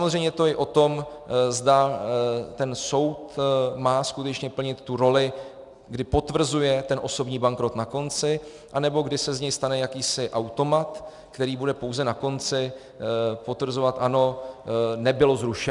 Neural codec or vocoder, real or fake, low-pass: none; real; 10.8 kHz